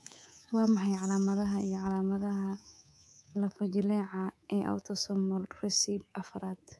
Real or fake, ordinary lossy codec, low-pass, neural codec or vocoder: fake; none; none; codec, 24 kHz, 3.1 kbps, DualCodec